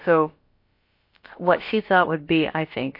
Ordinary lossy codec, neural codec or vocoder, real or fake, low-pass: MP3, 32 kbps; codec, 16 kHz, about 1 kbps, DyCAST, with the encoder's durations; fake; 5.4 kHz